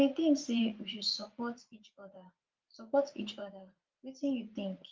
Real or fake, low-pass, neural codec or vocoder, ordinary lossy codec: fake; 7.2 kHz; vocoder, 44.1 kHz, 80 mel bands, Vocos; Opus, 24 kbps